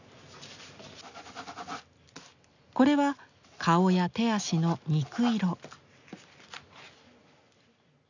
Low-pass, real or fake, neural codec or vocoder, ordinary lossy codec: 7.2 kHz; real; none; none